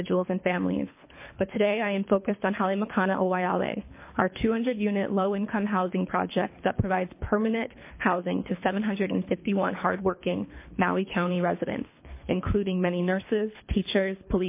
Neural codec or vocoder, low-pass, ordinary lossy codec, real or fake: codec, 24 kHz, 3 kbps, HILCodec; 3.6 kHz; MP3, 24 kbps; fake